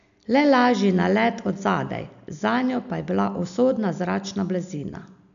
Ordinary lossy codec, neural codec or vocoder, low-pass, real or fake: none; none; 7.2 kHz; real